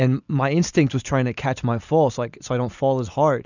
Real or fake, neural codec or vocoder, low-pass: real; none; 7.2 kHz